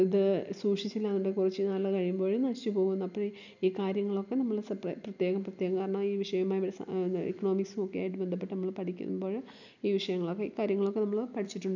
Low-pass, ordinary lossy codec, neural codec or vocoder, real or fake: 7.2 kHz; none; none; real